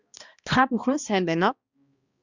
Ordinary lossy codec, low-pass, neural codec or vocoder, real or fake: Opus, 64 kbps; 7.2 kHz; codec, 16 kHz, 1 kbps, X-Codec, HuBERT features, trained on balanced general audio; fake